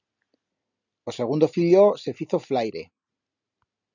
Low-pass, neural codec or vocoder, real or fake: 7.2 kHz; none; real